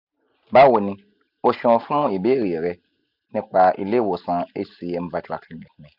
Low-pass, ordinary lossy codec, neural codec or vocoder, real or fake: 5.4 kHz; none; none; real